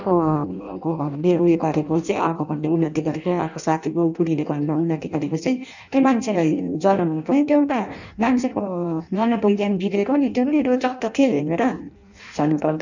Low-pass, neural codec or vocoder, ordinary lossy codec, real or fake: 7.2 kHz; codec, 16 kHz in and 24 kHz out, 0.6 kbps, FireRedTTS-2 codec; none; fake